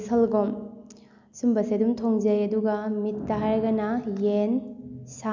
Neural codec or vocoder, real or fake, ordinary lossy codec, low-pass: none; real; none; 7.2 kHz